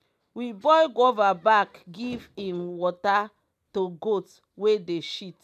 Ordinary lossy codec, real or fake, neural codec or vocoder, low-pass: none; real; none; 14.4 kHz